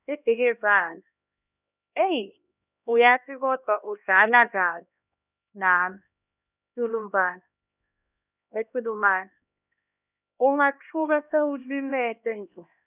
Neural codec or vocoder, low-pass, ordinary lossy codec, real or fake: codec, 16 kHz, 1 kbps, X-Codec, HuBERT features, trained on LibriSpeech; 3.6 kHz; none; fake